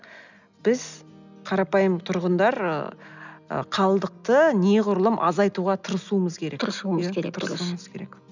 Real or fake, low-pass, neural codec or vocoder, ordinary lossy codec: real; 7.2 kHz; none; none